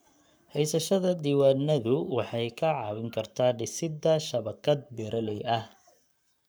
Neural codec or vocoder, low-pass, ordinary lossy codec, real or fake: codec, 44.1 kHz, 7.8 kbps, Pupu-Codec; none; none; fake